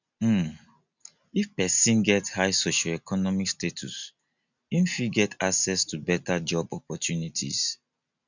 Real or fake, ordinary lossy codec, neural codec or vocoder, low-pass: real; none; none; 7.2 kHz